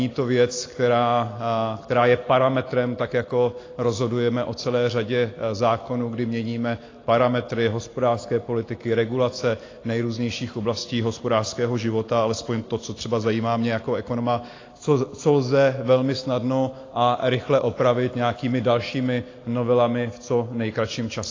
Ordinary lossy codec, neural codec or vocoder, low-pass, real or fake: AAC, 32 kbps; none; 7.2 kHz; real